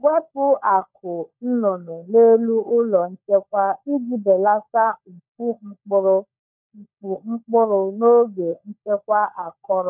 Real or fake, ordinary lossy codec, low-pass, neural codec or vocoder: fake; none; 3.6 kHz; codec, 16 kHz, 16 kbps, FunCodec, trained on LibriTTS, 50 frames a second